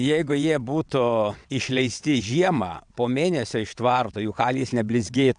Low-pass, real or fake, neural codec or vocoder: 9.9 kHz; fake; vocoder, 22.05 kHz, 80 mel bands, WaveNeXt